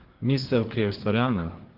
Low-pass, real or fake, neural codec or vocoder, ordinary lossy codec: 5.4 kHz; fake; codec, 24 kHz, 1 kbps, SNAC; Opus, 16 kbps